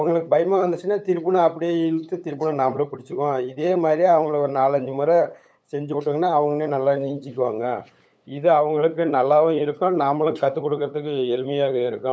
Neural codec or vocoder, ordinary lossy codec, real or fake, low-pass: codec, 16 kHz, 8 kbps, FunCodec, trained on LibriTTS, 25 frames a second; none; fake; none